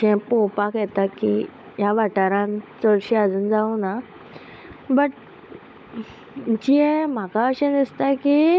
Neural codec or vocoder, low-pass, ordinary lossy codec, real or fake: codec, 16 kHz, 16 kbps, FunCodec, trained on LibriTTS, 50 frames a second; none; none; fake